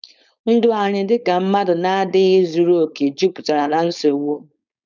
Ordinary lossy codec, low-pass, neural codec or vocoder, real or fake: none; 7.2 kHz; codec, 16 kHz, 4.8 kbps, FACodec; fake